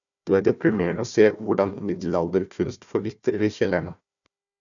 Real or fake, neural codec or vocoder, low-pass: fake; codec, 16 kHz, 1 kbps, FunCodec, trained on Chinese and English, 50 frames a second; 7.2 kHz